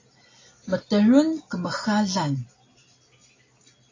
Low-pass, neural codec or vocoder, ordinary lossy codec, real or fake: 7.2 kHz; none; AAC, 32 kbps; real